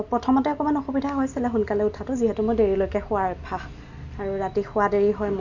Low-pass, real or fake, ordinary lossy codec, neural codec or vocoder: 7.2 kHz; real; none; none